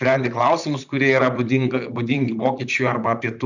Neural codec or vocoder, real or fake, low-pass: vocoder, 44.1 kHz, 128 mel bands, Pupu-Vocoder; fake; 7.2 kHz